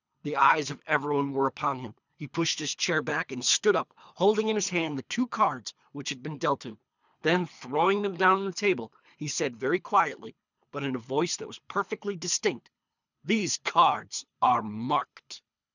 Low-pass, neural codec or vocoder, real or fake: 7.2 kHz; codec, 24 kHz, 3 kbps, HILCodec; fake